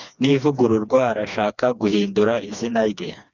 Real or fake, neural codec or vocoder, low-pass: fake; codec, 16 kHz, 2 kbps, FreqCodec, smaller model; 7.2 kHz